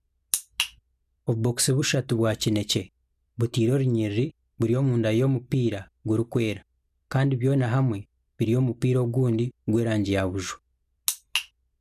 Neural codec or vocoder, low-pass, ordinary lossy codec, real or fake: none; 14.4 kHz; none; real